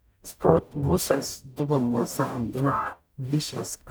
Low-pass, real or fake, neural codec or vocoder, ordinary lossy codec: none; fake; codec, 44.1 kHz, 0.9 kbps, DAC; none